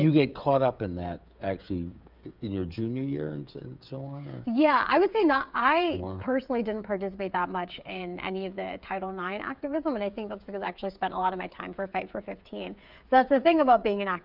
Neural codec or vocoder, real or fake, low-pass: codec, 16 kHz, 8 kbps, FreqCodec, smaller model; fake; 5.4 kHz